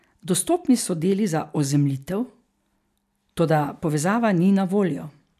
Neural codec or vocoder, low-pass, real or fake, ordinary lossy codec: none; 14.4 kHz; real; none